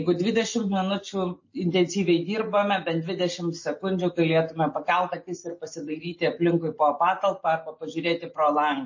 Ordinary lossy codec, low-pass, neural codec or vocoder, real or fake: MP3, 32 kbps; 7.2 kHz; none; real